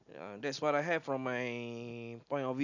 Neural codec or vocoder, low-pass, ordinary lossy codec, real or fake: none; 7.2 kHz; none; real